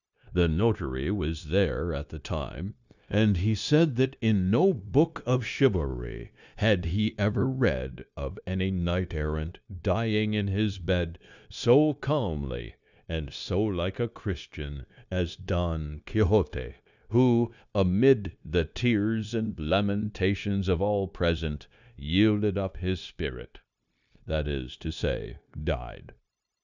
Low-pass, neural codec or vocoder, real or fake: 7.2 kHz; codec, 16 kHz, 0.9 kbps, LongCat-Audio-Codec; fake